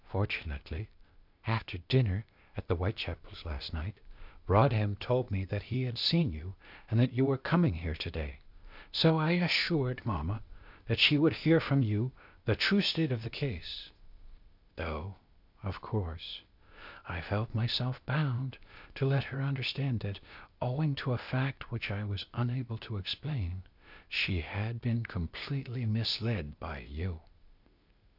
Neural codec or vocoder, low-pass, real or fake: codec, 16 kHz, 0.8 kbps, ZipCodec; 5.4 kHz; fake